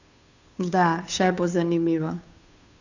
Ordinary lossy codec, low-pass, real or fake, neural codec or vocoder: none; 7.2 kHz; fake; codec, 16 kHz, 2 kbps, FunCodec, trained on Chinese and English, 25 frames a second